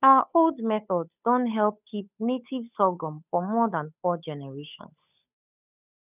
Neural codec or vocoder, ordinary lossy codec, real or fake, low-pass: codec, 16 kHz, 8 kbps, FunCodec, trained on Chinese and English, 25 frames a second; none; fake; 3.6 kHz